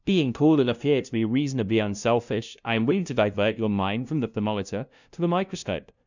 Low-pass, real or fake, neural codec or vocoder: 7.2 kHz; fake; codec, 16 kHz, 0.5 kbps, FunCodec, trained on LibriTTS, 25 frames a second